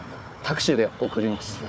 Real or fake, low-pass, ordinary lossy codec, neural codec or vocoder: fake; none; none; codec, 16 kHz, 4 kbps, FunCodec, trained on Chinese and English, 50 frames a second